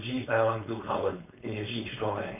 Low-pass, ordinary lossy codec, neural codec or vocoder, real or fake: 3.6 kHz; none; codec, 16 kHz, 4.8 kbps, FACodec; fake